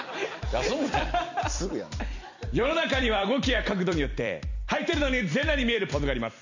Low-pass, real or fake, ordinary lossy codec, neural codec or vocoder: 7.2 kHz; fake; none; vocoder, 44.1 kHz, 128 mel bands every 256 samples, BigVGAN v2